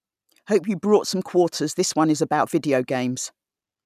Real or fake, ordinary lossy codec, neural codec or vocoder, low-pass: real; none; none; 14.4 kHz